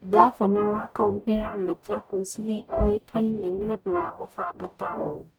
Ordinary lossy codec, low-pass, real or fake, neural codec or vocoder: none; none; fake; codec, 44.1 kHz, 0.9 kbps, DAC